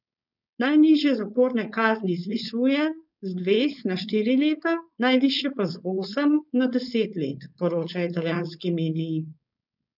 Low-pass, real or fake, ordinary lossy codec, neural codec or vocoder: 5.4 kHz; fake; none; codec, 16 kHz, 4.8 kbps, FACodec